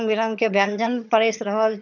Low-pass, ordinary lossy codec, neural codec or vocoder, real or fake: 7.2 kHz; none; vocoder, 22.05 kHz, 80 mel bands, HiFi-GAN; fake